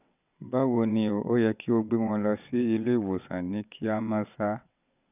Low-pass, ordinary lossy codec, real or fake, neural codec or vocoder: 3.6 kHz; none; fake; vocoder, 22.05 kHz, 80 mel bands, WaveNeXt